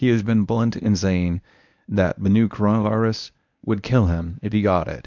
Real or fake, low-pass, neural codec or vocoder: fake; 7.2 kHz; codec, 24 kHz, 0.9 kbps, WavTokenizer, medium speech release version 1